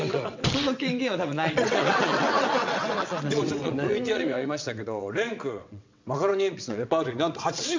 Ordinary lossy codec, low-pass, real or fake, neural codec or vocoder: MP3, 64 kbps; 7.2 kHz; fake; vocoder, 22.05 kHz, 80 mel bands, WaveNeXt